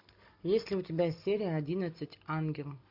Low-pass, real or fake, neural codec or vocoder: 5.4 kHz; real; none